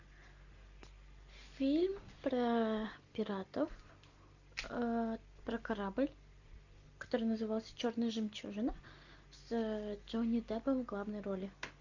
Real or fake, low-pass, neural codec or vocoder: real; 7.2 kHz; none